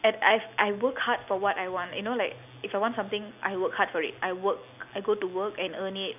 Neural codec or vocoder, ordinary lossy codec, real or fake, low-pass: none; none; real; 3.6 kHz